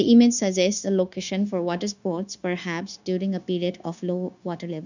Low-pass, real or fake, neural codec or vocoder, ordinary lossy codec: 7.2 kHz; fake; codec, 16 kHz, 0.9 kbps, LongCat-Audio-Codec; none